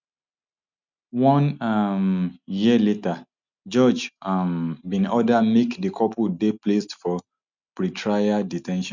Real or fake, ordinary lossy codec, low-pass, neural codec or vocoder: real; none; 7.2 kHz; none